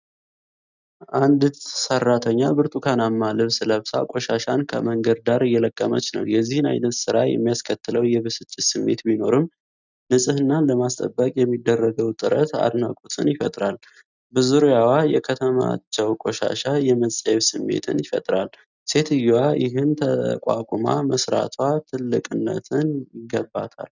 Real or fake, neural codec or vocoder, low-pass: real; none; 7.2 kHz